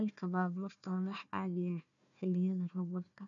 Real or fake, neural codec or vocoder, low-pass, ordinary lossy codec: fake; codec, 16 kHz, 1 kbps, FunCodec, trained on Chinese and English, 50 frames a second; 7.2 kHz; none